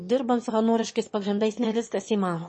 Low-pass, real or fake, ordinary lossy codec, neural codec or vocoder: 9.9 kHz; fake; MP3, 32 kbps; autoencoder, 22.05 kHz, a latent of 192 numbers a frame, VITS, trained on one speaker